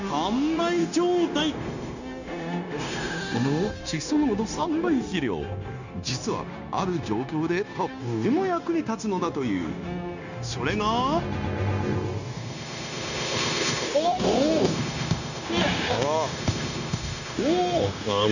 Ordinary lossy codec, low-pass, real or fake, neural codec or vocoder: none; 7.2 kHz; fake; codec, 16 kHz, 0.9 kbps, LongCat-Audio-Codec